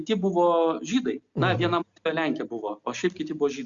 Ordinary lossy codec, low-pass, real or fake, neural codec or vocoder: Opus, 64 kbps; 7.2 kHz; real; none